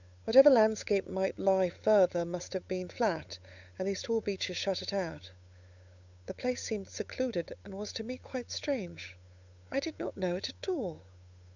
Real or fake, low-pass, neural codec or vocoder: fake; 7.2 kHz; codec, 16 kHz, 8 kbps, FunCodec, trained on Chinese and English, 25 frames a second